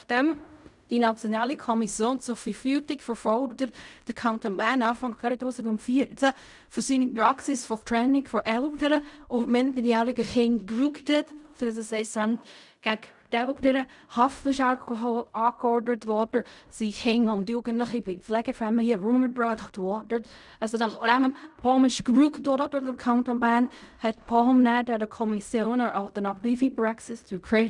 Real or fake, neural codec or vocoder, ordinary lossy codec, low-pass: fake; codec, 16 kHz in and 24 kHz out, 0.4 kbps, LongCat-Audio-Codec, fine tuned four codebook decoder; none; 10.8 kHz